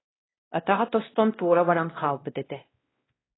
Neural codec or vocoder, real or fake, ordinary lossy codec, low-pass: codec, 16 kHz, 1 kbps, X-Codec, WavLM features, trained on Multilingual LibriSpeech; fake; AAC, 16 kbps; 7.2 kHz